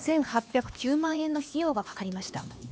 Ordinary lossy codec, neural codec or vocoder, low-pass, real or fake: none; codec, 16 kHz, 2 kbps, X-Codec, HuBERT features, trained on LibriSpeech; none; fake